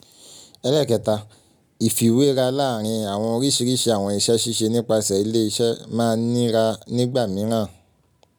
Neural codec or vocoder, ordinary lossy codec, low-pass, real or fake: none; none; none; real